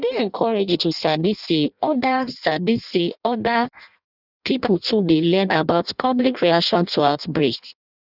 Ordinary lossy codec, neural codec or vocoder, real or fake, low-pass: none; codec, 16 kHz in and 24 kHz out, 0.6 kbps, FireRedTTS-2 codec; fake; 5.4 kHz